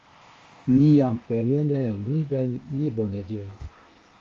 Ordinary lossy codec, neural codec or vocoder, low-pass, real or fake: Opus, 32 kbps; codec, 16 kHz, 0.8 kbps, ZipCodec; 7.2 kHz; fake